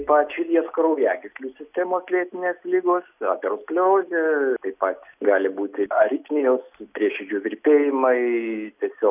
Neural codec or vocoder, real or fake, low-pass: none; real; 3.6 kHz